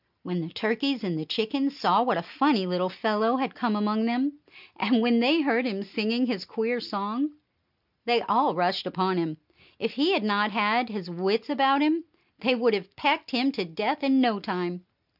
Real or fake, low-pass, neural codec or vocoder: real; 5.4 kHz; none